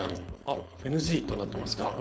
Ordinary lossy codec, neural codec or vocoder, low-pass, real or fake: none; codec, 16 kHz, 4.8 kbps, FACodec; none; fake